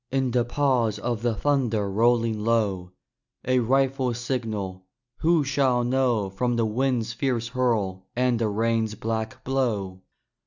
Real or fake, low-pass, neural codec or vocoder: real; 7.2 kHz; none